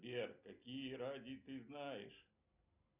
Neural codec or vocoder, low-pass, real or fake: none; 3.6 kHz; real